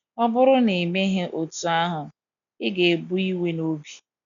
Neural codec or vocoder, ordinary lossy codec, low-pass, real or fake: none; none; 7.2 kHz; real